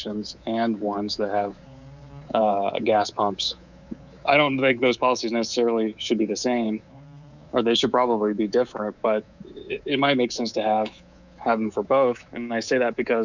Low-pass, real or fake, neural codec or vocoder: 7.2 kHz; fake; codec, 24 kHz, 3.1 kbps, DualCodec